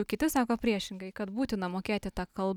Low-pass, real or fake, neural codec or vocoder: 19.8 kHz; real; none